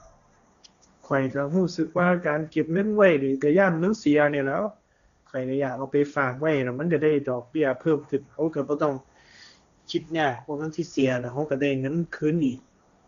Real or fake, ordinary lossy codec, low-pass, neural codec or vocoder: fake; none; 7.2 kHz; codec, 16 kHz, 1.1 kbps, Voila-Tokenizer